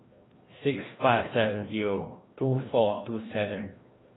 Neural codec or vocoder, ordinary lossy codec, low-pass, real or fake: codec, 16 kHz, 1 kbps, FreqCodec, larger model; AAC, 16 kbps; 7.2 kHz; fake